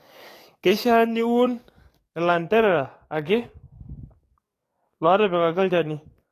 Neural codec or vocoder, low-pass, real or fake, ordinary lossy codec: codec, 44.1 kHz, 7.8 kbps, DAC; 14.4 kHz; fake; AAC, 48 kbps